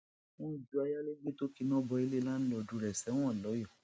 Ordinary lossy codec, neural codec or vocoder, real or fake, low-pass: none; none; real; none